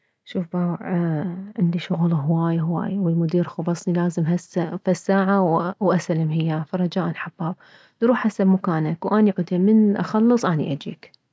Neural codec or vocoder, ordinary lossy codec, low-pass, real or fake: none; none; none; real